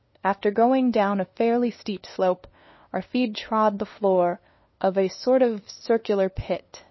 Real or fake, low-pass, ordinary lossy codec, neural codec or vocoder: fake; 7.2 kHz; MP3, 24 kbps; codec, 16 kHz, 2 kbps, FunCodec, trained on LibriTTS, 25 frames a second